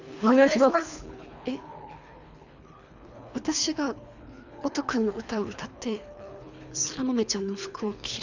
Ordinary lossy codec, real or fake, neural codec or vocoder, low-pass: none; fake; codec, 24 kHz, 3 kbps, HILCodec; 7.2 kHz